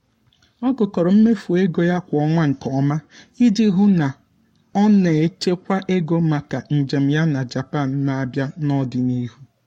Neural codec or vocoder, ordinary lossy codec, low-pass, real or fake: codec, 44.1 kHz, 7.8 kbps, Pupu-Codec; MP3, 64 kbps; 19.8 kHz; fake